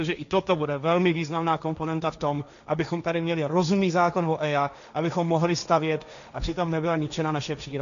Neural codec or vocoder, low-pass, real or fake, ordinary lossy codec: codec, 16 kHz, 1.1 kbps, Voila-Tokenizer; 7.2 kHz; fake; AAC, 96 kbps